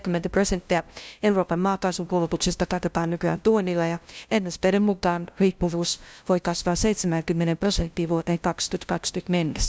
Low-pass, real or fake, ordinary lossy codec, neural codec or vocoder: none; fake; none; codec, 16 kHz, 0.5 kbps, FunCodec, trained on LibriTTS, 25 frames a second